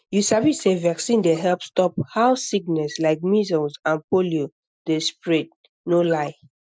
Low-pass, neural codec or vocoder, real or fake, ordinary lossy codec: none; none; real; none